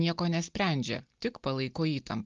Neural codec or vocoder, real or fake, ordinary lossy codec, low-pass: none; real; Opus, 32 kbps; 7.2 kHz